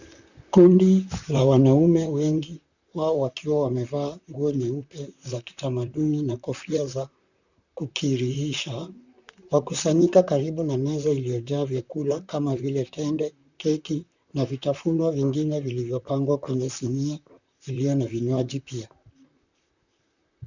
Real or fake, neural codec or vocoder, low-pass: fake; vocoder, 44.1 kHz, 128 mel bands, Pupu-Vocoder; 7.2 kHz